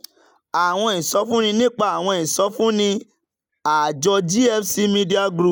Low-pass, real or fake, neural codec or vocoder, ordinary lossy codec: 19.8 kHz; real; none; none